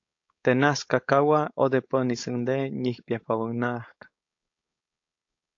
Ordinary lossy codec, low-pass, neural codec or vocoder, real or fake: AAC, 48 kbps; 7.2 kHz; codec, 16 kHz, 4.8 kbps, FACodec; fake